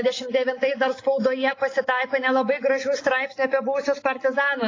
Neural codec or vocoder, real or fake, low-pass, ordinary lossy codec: none; real; 7.2 kHz; AAC, 32 kbps